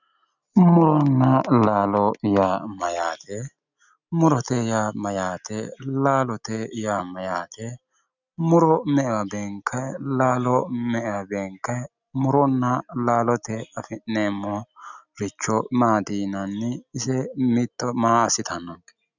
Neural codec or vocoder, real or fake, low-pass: none; real; 7.2 kHz